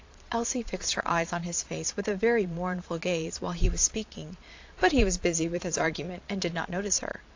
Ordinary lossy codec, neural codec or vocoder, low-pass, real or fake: AAC, 48 kbps; vocoder, 22.05 kHz, 80 mel bands, Vocos; 7.2 kHz; fake